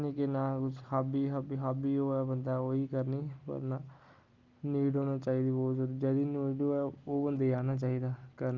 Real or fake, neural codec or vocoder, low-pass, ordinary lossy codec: real; none; 7.2 kHz; Opus, 32 kbps